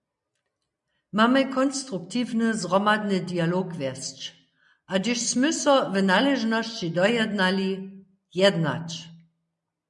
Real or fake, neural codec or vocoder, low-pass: real; none; 10.8 kHz